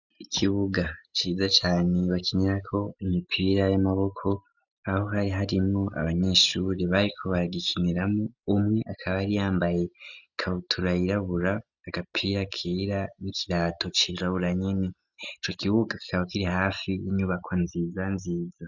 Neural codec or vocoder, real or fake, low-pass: none; real; 7.2 kHz